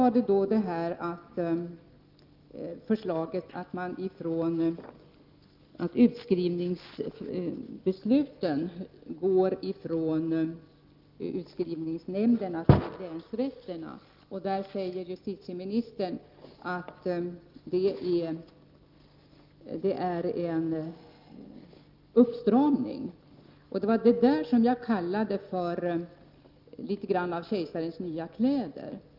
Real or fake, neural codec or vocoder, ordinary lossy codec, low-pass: real; none; Opus, 24 kbps; 5.4 kHz